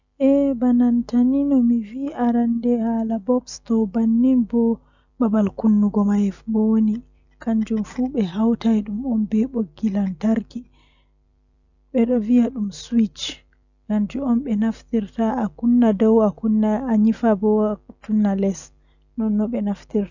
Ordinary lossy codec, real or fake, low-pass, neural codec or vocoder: none; real; 7.2 kHz; none